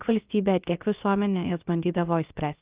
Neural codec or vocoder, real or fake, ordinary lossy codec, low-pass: codec, 16 kHz, about 1 kbps, DyCAST, with the encoder's durations; fake; Opus, 24 kbps; 3.6 kHz